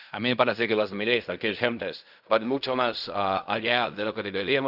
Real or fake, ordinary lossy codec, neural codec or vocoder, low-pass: fake; none; codec, 16 kHz in and 24 kHz out, 0.4 kbps, LongCat-Audio-Codec, fine tuned four codebook decoder; 5.4 kHz